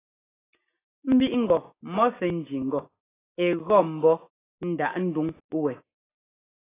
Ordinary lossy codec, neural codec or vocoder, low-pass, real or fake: AAC, 16 kbps; none; 3.6 kHz; real